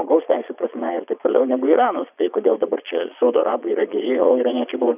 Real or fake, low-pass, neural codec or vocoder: fake; 3.6 kHz; vocoder, 22.05 kHz, 80 mel bands, Vocos